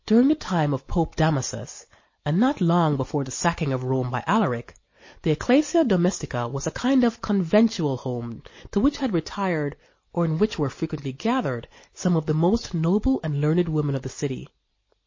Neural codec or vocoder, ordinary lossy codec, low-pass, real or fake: none; MP3, 32 kbps; 7.2 kHz; real